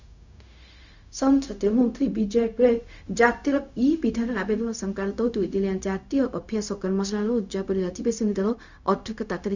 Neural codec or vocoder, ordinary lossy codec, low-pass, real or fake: codec, 16 kHz, 0.4 kbps, LongCat-Audio-Codec; none; 7.2 kHz; fake